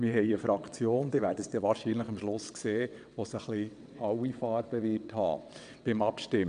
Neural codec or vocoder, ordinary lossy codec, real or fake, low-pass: vocoder, 22.05 kHz, 80 mel bands, WaveNeXt; MP3, 96 kbps; fake; 9.9 kHz